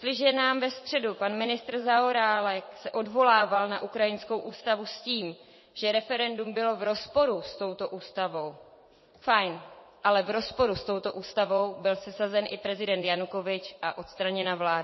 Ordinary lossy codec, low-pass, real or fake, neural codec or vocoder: MP3, 24 kbps; 7.2 kHz; fake; vocoder, 44.1 kHz, 80 mel bands, Vocos